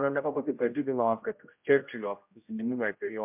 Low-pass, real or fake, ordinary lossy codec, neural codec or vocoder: 3.6 kHz; fake; MP3, 32 kbps; codec, 16 kHz, 0.5 kbps, X-Codec, HuBERT features, trained on general audio